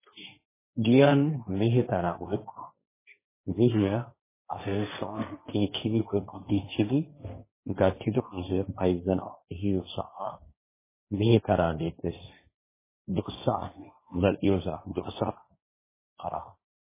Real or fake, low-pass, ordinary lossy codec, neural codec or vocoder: fake; 3.6 kHz; MP3, 16 kbps; codec, 16 kHz, 1.1 kbps, Voila-Tokenizer